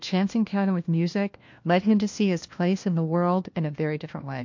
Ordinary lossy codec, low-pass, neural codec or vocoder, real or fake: MP3, 48 kbps; 7.2 kHz; codec, 16 kHz, 1 kbps, FunCodec, trained on LibriTTS, 50 frames a second; fake